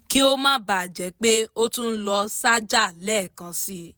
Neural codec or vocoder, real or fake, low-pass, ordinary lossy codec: vocoder, 48 kHz, 128 mel bands, Vocos; fake; none; none